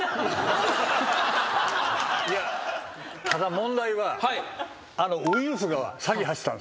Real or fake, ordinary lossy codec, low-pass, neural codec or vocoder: real; none; none; none